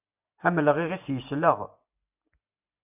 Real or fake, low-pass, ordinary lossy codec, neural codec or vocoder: real; 3.6 kHz; AAC, 32 kbps; none